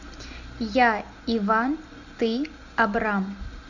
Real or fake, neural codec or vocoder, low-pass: real; none; 7.2 kHz